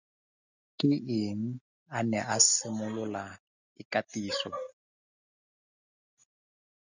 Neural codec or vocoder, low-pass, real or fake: none; 7.2 kHz; real